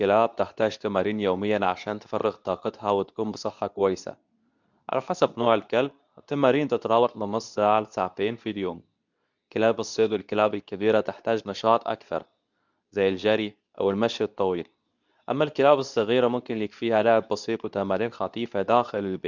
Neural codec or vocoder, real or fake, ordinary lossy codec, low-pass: codec, 24 kHz, 0.9 kbps, WavTokenizer, medium speech release version 2; fake; none; 7.2 kHz